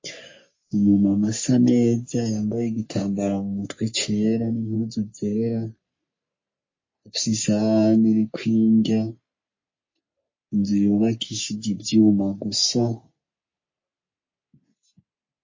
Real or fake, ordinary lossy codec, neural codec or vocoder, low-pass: fake; MP3, 32 kbps; codec, 44.1 kHz, 3.4 kbps, Pupu-Codec; 7.2 kHz